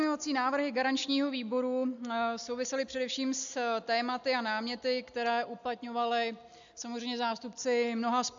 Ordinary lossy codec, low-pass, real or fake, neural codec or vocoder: AAC, 64 kbps; 7.2 kHz; real; none